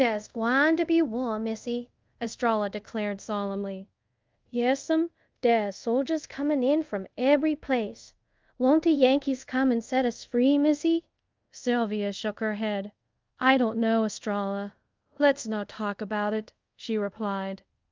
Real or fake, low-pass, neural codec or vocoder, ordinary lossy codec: fake; 7.2 kHz; codec, 24 kHz, 0.5 kbps, DualCodec; Opus, 24 kbps